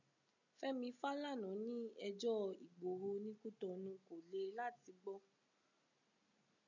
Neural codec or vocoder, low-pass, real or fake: none; 7.2 kHz; real